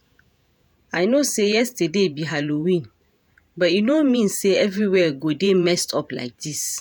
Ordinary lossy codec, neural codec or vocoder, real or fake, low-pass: none; vocoder, 48 kHz, 128 mel bands, Vocos; fake; none